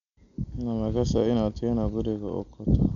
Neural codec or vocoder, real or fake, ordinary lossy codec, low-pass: none; real; none; 7.2 kHz